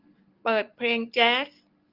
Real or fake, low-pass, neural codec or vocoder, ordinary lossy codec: real; 5.4 kHz; none; Opus, 32 kbps